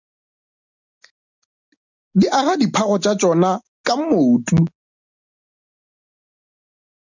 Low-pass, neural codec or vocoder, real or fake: 7.2 kHz; none; real